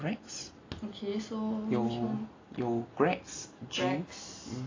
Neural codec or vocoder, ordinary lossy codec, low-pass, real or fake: none; AAC, 32 kbps; 7.2 kHz; real